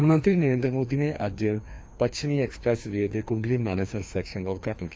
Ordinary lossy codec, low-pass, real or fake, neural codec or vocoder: none; none; fake; codec, 16 kHz, 2 kbps, FreqCodec, larger model